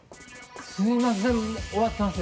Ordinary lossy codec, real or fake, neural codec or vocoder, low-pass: none; real; none; none